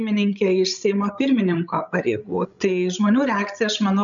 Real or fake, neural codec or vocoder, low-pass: fake; codec, 16 kHz, 16 kbps, FreqCodec, larger model; 7.2 kHz